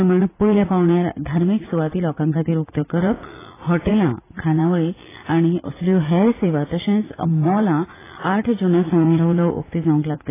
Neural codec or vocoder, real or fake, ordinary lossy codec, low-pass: vocoder, 44.1 kHz, 80 mel bands, Vocos; fake; AAC, 16 kbps; 3.6 kHz